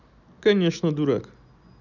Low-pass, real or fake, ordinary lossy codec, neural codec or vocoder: 7.2 kHz; real; none; none